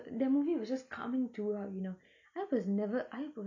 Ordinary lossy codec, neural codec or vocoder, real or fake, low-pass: none; none; real; 7.2 kHz